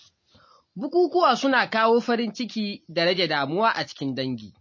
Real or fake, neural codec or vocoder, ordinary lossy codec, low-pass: real; none; MP3, 32 kbps; 7.2 kHz